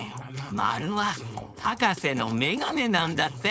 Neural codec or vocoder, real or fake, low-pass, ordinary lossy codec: codec, 16 kHz, 4.8 kbps, FACodec; fake; none; none